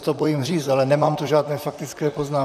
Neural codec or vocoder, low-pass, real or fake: vocoder, 44.1 kHz, 128 mel bands, Pupu-Vocoder; 14.4 kHz; fake